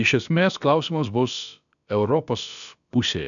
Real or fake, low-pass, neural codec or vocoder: fake; 7.2 kHz; codec, 16 kHz, about 1 kbps, DyCAST, with the encoder's durations